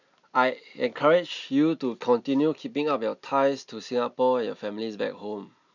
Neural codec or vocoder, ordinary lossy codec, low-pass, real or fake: none; none; 7.2 kHz; real